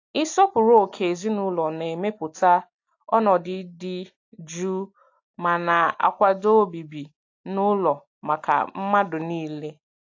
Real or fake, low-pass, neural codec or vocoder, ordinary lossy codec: real; 7.2 kHz; none; AAC, 48 kbps